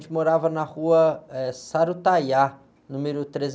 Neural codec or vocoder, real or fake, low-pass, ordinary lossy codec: none; real; none; none